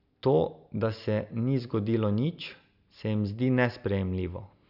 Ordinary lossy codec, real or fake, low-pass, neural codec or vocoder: none; real; 5.4 kHz; none